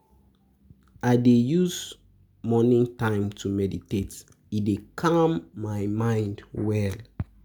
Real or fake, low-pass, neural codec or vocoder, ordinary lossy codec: real; none; none; none